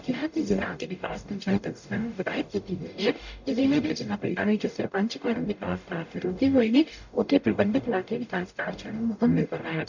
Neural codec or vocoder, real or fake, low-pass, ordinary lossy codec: codec, 44.1 kHz, 0.9 kbps, DAC; fake; 7.2 kHz; none